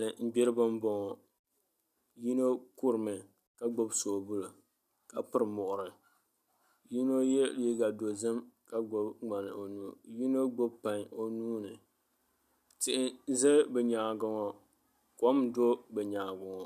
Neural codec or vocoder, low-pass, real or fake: none; 14.4 kHz; real